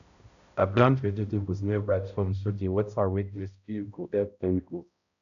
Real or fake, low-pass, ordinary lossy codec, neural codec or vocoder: fake; 7.2 kHz; none; codec, 16 kHz, 0.5 kbps, X-Codec, HuBERT features, trained on balanced general audio